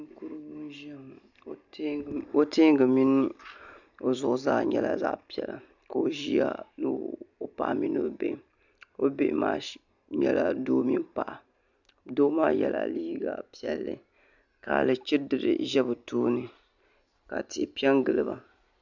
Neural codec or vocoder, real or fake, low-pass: none; real; 7.2 kHz